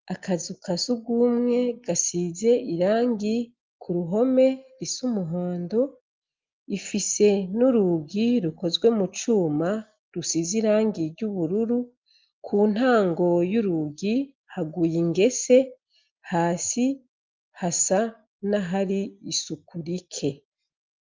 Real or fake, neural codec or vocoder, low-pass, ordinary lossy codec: real; none; 7.2 kHz; Opus, 24 kbps